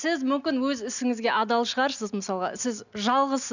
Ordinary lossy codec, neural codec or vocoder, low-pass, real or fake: none; none; 7.2 kHz; real